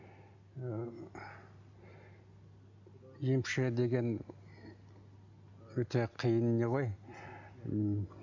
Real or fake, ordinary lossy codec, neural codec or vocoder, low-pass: real; none; none; 7.2 kHz